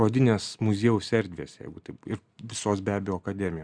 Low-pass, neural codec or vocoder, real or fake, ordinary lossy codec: 9.9 kHz; none; real; Opus, 64 kbps